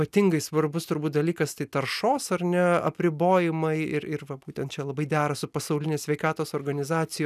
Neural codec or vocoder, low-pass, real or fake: none; 14.4 kHz; real